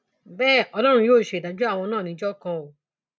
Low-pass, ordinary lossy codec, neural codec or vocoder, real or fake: none; none; none; real